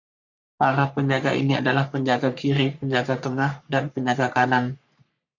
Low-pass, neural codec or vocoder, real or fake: 7.2 kHz; codec, 44.1 kHz, 3.4 kbps, Pupu-Codec; fake